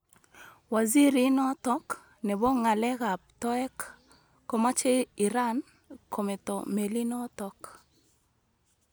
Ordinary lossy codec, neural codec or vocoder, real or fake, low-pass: none; none; real; none